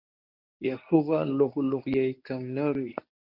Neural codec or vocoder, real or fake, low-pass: codec, 24 kHz, 0.9 kbps, WavTokenizer, medium speech release version 1; fake; 5.4 kHz